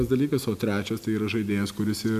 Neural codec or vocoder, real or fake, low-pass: none; real; 14.4 kHz